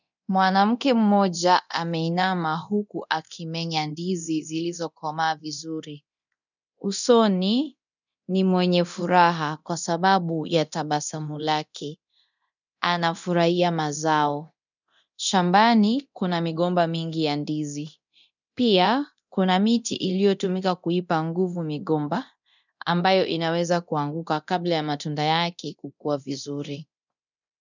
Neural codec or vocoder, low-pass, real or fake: codec, 24 kHz, 0.9 kbps, DualCodec; 7.2 kHz; fake